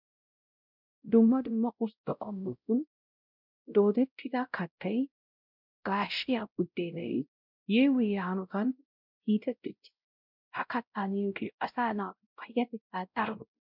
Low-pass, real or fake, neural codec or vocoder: 5.4 kHz; fake; codec, 16 kHz, 0.5 kbps, X-Codec, WavLM features, trained on Multilingual LibriSpeech